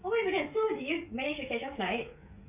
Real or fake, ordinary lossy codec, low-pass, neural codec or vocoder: fake; MP3, 32 kbps; 3.6 kHz; codec, 16 kHz, 16 kbps, FreqCodec, smaller model